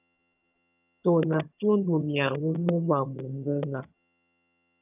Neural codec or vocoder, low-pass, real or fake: vocoder, 22.05 kHz, 80 mel bands, HiFi-GAN; 3.6 kHz; fake